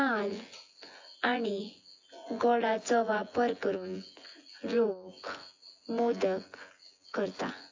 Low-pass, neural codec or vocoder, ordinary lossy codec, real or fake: 7.2 kHz; vocoder, 24 kHz, 100 mel bands, Vocos; AAC, 48 kbps; fake